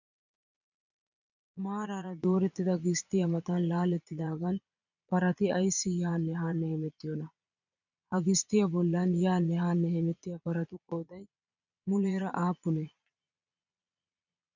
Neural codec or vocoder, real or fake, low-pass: vocoder, 22.05 kHz, 80 mel bands, Vocos; fake; 7.2 kHz